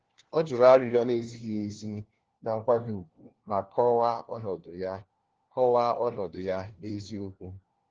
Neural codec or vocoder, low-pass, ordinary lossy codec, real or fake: codec, 16 kHz, 1 kbps, FunCodec, trained on LibriTTS, 50 frames a second; 7.2 kHz; Opus, 16 kbps; fake